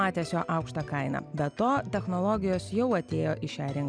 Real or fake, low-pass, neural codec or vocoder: real; 9.9 kHz; none